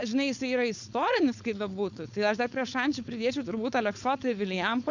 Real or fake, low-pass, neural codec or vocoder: fake; 7.2 kHz; codec, 16 kHz, 4.8 kbps, FACodec